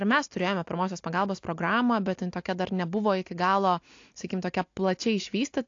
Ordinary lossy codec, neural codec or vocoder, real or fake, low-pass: AAC, 48 kbps; none; real; 7.2 kHz